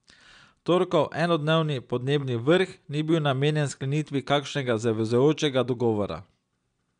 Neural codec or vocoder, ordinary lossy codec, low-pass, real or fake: none; none; 9.9 kHz; real